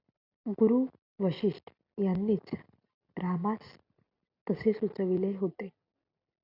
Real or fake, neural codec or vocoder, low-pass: real; none; 5.4 kHz